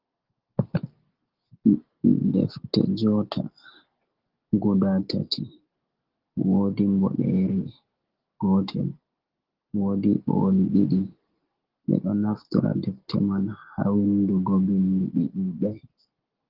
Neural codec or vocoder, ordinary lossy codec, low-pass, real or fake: none; Opus, 16 kbps; 5.4 kHz; real